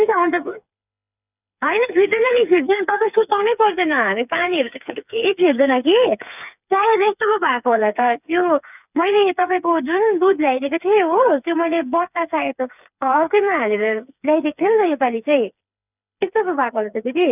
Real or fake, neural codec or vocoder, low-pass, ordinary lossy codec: fake; codec, 16 kHz, 4 kbps, FreqCodec, smaller model; 3.6 kHz; none